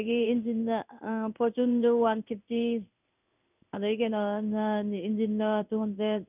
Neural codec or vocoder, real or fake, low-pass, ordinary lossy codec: codec, 16 kHz in and 24 kHz out, 1 kbps, XY-Tokenizer; fake; 3.6 kHz; none